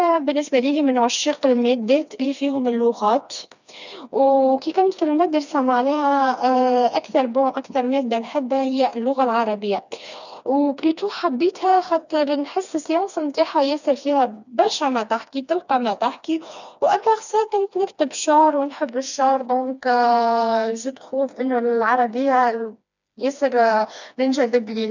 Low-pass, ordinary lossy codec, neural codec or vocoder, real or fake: 7.2 kHz; none; codec, 16 kHz, 2 kbps, FreqCodec, smaller model; fake